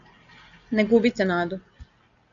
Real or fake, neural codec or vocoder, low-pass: real; none; 7.2 kHz